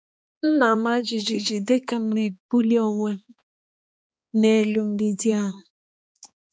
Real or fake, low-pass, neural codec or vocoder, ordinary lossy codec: fake; none; codec, 16 kHz, 2 kbps, X-Codec, HuBERT features, trained on balanced general audio; none